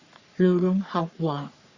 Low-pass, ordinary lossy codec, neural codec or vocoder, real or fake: 7.2 kHz; Opus, 64 kbps; codec, 44.1 kHz, 3.4 kbps, Pupu-Codec; fake